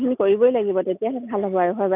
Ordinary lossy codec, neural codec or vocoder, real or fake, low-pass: none; none; real; 3.6 kHz